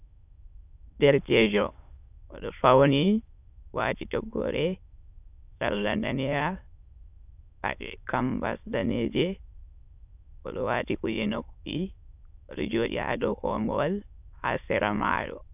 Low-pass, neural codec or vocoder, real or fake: 3.6 kHz; autoencoder, 22.05 kHz, a latent of 192 numbers a frame, VITS, trained on many speakers; fake